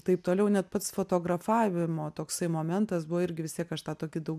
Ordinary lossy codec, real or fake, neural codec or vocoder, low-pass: MP3, 96 kbps; real; none; 14.4 kHz